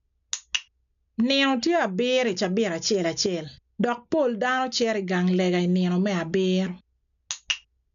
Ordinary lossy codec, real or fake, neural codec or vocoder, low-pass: none; real; none; 7.2 kHz